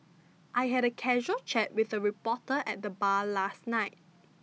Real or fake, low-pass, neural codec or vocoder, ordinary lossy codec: real; none; none; none